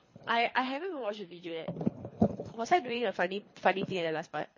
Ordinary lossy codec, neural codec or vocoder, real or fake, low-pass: MP3, 32 kbps; codec, 24 kHz, 3 kbps, HILCodec; fake; 7.2 kHz